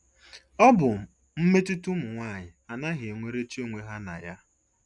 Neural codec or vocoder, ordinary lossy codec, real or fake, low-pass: none; none; real; 10.8 kHz